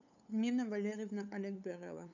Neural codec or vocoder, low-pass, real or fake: codec, 16 kHz, 8 kbps, FunCodec, trained on LibriTTS, 25 frames a second; 7.2 kHz; fake